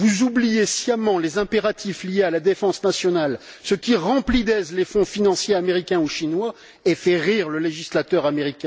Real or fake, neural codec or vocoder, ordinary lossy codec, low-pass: real; none; none; none